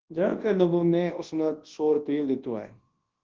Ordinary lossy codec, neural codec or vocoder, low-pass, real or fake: Opus, 16 kbps; codec, 24 kHz, 0.9 kbps, WavTokenizer, large speech release; 7.2 kHz; fake